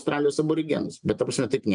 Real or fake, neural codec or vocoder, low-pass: real; none; 9.9 kHz